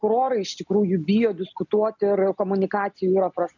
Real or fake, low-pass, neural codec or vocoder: real; 7.2 kHz; none